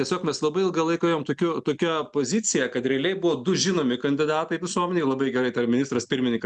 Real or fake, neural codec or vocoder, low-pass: real; none; 10.8 kHz